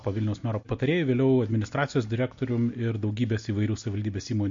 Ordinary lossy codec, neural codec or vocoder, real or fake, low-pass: MP3, 48 kbps; none; real; 7.2 kHz